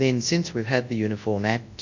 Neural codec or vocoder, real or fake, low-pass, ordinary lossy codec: codec, 24 kHz, 0.9 kbps, WavTokenizer, large speech release; fake; 7.2 kHz; AAC, 48 kbps